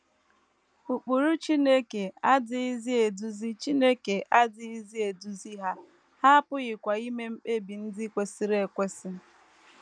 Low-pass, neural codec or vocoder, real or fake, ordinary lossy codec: 9.9 kHz; none; real; none